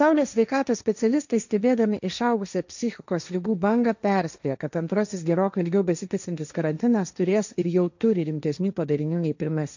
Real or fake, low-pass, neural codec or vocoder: fake; 7.2 kHz; codec, 16 kHz, 1.1 kbps, Voila-Tokenizer